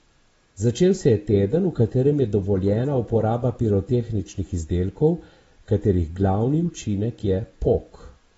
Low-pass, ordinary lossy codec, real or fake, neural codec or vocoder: 19.8 kHz; AAC, 24 kbps; real; none